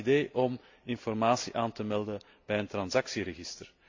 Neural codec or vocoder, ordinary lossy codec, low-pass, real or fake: none; AAC, 48 kbps; 7.2 kHz; real